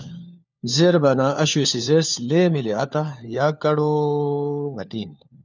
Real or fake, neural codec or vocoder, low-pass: fake; codec, 16 kHz, 4 kbps, FunCodec, trained on LibriTTS, 50 frames a second; 7.2 kHz